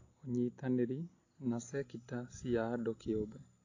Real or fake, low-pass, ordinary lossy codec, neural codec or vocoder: real; 7.2 kHz; AAC, 32 kbps; none